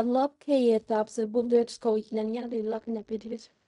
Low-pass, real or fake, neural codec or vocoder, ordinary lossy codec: 10.8 kHz; fake; codec, 16 kHz in and 24 kHz out, 0.4 kbps, LongCat-Audio-Codec, fine tuned four codebook decoder; none